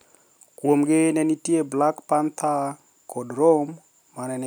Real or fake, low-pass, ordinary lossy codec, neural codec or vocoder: real; none; none; none